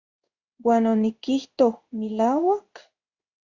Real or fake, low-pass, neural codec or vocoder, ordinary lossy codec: fake; 7.2 kHz; codec, 16 kHz in and 24 kHz out, 1 kbps, XY-Tokenizer; Opus, 64 kbps